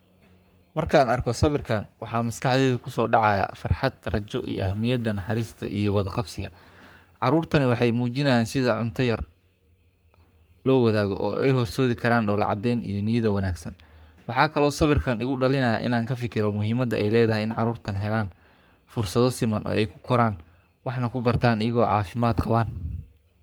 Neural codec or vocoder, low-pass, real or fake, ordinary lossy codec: codec, 44.1 kHz, 3.4 kbps, Pupu-Codec; none; fake; none